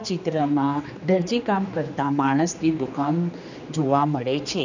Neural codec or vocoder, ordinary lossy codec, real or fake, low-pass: codec, 16 kHz, 2 kbps, X-Codec, HuBERT features, trained on general audio; none; fake; 7.2 kHz